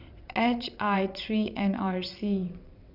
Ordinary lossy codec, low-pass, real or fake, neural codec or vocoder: none; 5.4 kHz; fake; vocoder, 22.05 kHz, 80 mel bands, WaveNeXt